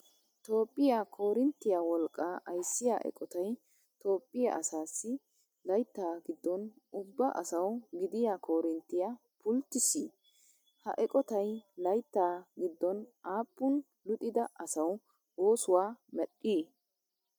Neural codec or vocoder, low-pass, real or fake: none; 19.8 kHz; real